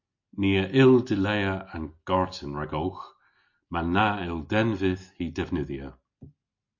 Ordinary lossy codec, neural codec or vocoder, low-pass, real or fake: MP3, 48 kbps; none; 7.2 kHz; real